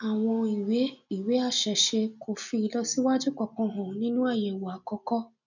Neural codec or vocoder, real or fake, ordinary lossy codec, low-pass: none; real; none; 7.2 kHz